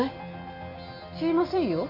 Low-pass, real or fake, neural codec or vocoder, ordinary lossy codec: 5.4 kHz; real; none; MP3, 32 kbps